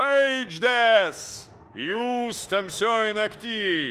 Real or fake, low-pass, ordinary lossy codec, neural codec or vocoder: fake; 14.4 kHz; Opus, 32 kbps; autoencoder, 48 kHz, 32 numbers a frame, DAC-VAE, trained on Japanese speech